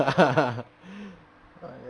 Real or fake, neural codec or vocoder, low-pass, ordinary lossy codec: fake; vocoder, 44.1 kHz, 128 mel bands every 512 samples, BigVGAN v2; 9.9 kHz; none